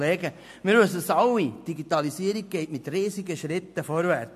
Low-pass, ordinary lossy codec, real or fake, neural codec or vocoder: 14.4 kHz; MP3, 64 kbps; real; none